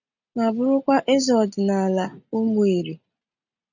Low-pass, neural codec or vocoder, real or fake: 7.2 kHz; none; real